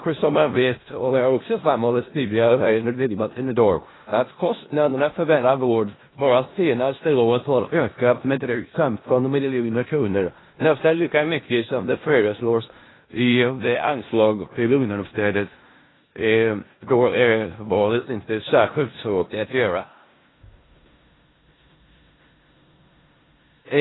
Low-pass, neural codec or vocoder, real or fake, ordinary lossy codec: 7.2 kHz; codec, 16 kHz in and 24 kHz out, 0.4 kbps, LongCat-Audio-Codec, four codebook decoder; fake; AAC, 16 kbps